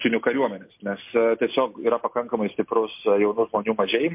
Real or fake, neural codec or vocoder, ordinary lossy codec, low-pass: real; none; MP3, 32 kbps; 3.6 kHz